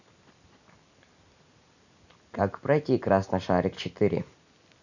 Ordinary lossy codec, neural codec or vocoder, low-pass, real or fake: none; none; 7.2 kHz; real